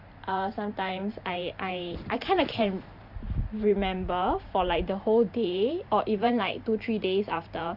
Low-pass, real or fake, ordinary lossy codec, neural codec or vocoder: 5.4 kHz; fake; Opus, 64 kbps; vocoder, 44.1 kHz, 128 mel bands every 512 samples, BigVGAN v2